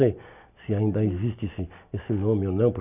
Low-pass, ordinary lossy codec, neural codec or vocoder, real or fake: 3.6 kHz; none; none; real